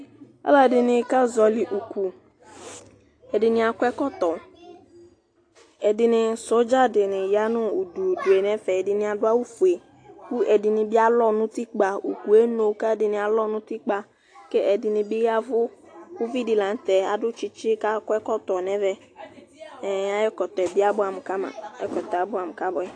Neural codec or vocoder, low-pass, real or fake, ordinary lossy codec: none; 9.9 kHz; real; AAC, 48 kbps